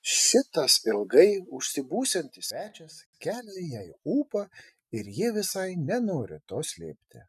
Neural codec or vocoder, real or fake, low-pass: none; real; 14.4 kHz